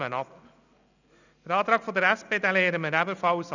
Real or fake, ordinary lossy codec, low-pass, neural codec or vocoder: real; none; 7.2 kHz; none